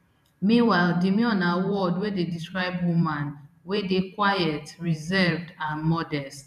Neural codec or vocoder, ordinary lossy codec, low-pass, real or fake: vocoder, 44.1 kHz, 128 mel bands every 512 samples, BigVGAN v2; none; 14.4 kHz; fake